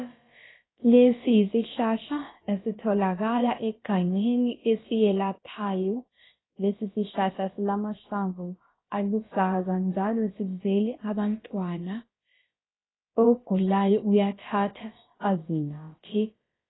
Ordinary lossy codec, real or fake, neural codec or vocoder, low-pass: AAC, 16 kbps; fake; codec, 16 kHz, about 1 kbps, DyCAST, with the encoder's durations; 7.2 kHz